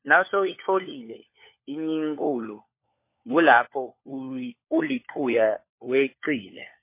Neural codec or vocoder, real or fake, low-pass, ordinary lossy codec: codec, 16 kHz, 4 kbps, FunCodec, trained on LibriTTS, 50 frames a second; fake; 3.6 kHz; MP3, 24 kbps